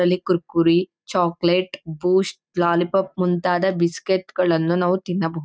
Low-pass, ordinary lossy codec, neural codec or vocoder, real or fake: none; none; none; real